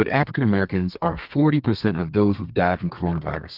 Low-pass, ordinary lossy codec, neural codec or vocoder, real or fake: 5.4 kHz; Opus, 32 kbps; codec, 32 kHz, 1.9 kbps, SNAC; fake